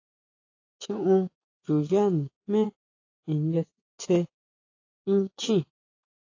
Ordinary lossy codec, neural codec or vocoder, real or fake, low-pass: AAC, 32 kbps; vocoder, 24 kHz, 100 mel bands, Vocos; fake; 7.2 kHz